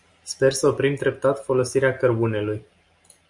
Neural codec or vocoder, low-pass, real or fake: none; 10.8 kHz; real